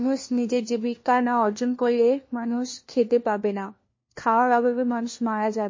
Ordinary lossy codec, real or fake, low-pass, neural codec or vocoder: MP3, 32 kbps; fake; 7.2 kHz; codec, 16 kHz, 1 kbps, FunCodec, trained on LibriTTS, 50 frames a second